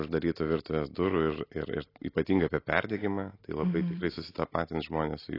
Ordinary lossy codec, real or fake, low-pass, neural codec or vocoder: AAC, 24 kbps; real; 5.4 kHz; none